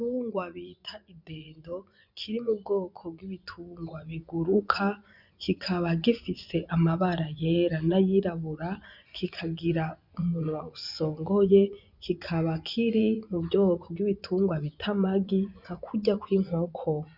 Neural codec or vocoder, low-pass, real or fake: vocoder, 24 kHz, 100 mel bands, Vocos; 5.4 kHz; fake